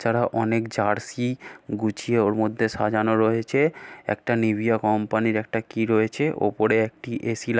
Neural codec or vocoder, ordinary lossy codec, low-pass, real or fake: none; none; none; real